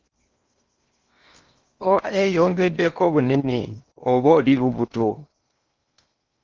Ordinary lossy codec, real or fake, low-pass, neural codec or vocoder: Opus, 32 kbps; fake; 7.2 kHz; codec, 16 kHz in and 24 kHz out, 0.8 kbps, FocalCodec, streaming, 65536 codes